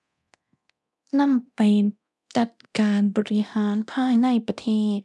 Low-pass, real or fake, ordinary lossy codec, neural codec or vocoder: none; fake; none; codec, 24 kHz, 0.9 kbps, DualCodec